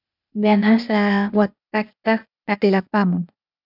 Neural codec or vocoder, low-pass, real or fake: codec, 16 kHz, 0.8 kbps, ZipCodec; 5.4 kHz; fake